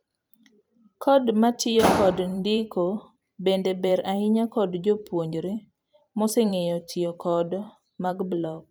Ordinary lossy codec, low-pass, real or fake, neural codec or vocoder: none; none; fake; vocoder, 44.1 kHz, 128 mel bands every 512 samples, BigVGAN v2